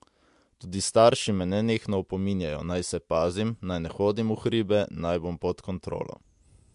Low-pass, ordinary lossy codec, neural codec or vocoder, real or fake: 10.8 kHz; MP3, 64 kbps; none; real